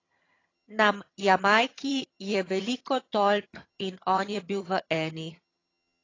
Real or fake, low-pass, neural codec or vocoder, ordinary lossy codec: fake; 7.2 kHz; vocoder, 22.05 kHz, 80 mel bands, HiFi-GAN; AAC, 32 kbps